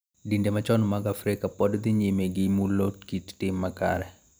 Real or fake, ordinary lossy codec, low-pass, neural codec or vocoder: real; none; none; none